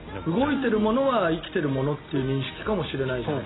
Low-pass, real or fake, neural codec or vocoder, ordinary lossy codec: 7.2 kHz; real; none; AAC, 16 kbps